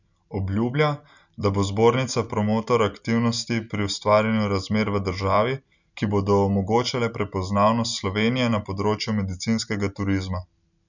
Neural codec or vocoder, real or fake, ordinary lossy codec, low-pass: none; real; none; 7.2 kHz